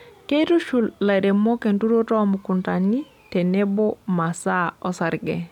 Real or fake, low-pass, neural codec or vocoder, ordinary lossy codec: real; 19.8 kHz; none; none